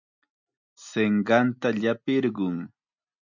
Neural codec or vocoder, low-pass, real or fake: none; 7.2 kHz; real